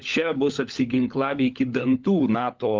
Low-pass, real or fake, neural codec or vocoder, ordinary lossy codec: 7.2 kHz; fake; codec, 16 kHz, 16 kbps, FunCodec, trained on LibriTTS, 50 frames a second; Opus, 32 kbps